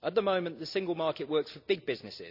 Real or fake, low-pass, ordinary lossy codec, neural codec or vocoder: real; 5.4 kHz; none; none